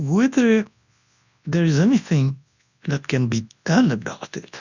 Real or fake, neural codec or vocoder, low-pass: fake; codec, 24 kHz, 0.9 kbps, WavTokenizer, large speech release; 7.2 kHz